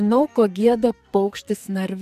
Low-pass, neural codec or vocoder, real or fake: 14.4 kHz; codec, 44.1 kHz, 2.6 kbps, SNAC; fake